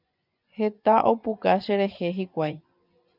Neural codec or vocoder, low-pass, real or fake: vocoder, 24 kHz, 100 mel bands, Vocos; 5.4 kHz; fake